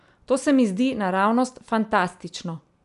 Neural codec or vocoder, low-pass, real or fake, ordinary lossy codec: none; 10.8 kHz; real; none